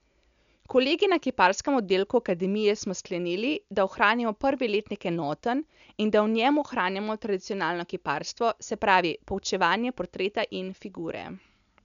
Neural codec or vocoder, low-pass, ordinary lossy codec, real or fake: none; 7.2 kHz; none; real